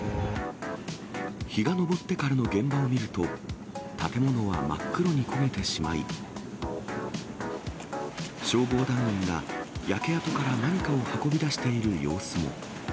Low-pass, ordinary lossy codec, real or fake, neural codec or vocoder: none; none; real; none